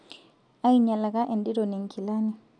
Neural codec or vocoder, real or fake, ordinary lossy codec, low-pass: none; real; none; 9.9 kHz